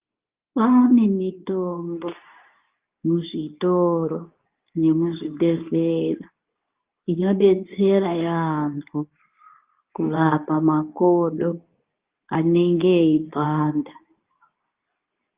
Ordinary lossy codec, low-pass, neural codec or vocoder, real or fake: Opus, 32 kbps; 3.6 kHz; codec, 24 kHz, 0.9 kbps, WavTokenizer, medium speech release version 2; fake